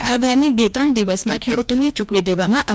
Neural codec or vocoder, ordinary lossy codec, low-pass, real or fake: codec, 16 kHz, 1 kbps, FreqCodec, larger model; none; none; fake